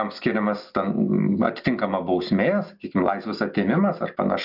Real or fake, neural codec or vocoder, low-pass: real; none; 5.4 kHz